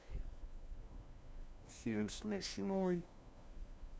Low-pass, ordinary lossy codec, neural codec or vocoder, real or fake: none; none; codec, 16 kHz, 1 kbps, FunCodec, trained on LibriTTS, 50 frames a second; fake